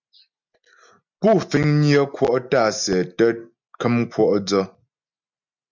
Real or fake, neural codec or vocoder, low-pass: real; none; 7.2 kHz